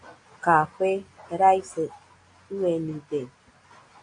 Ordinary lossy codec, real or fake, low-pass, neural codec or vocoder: AAC, 48 kbps; real; 9.9 kHz; none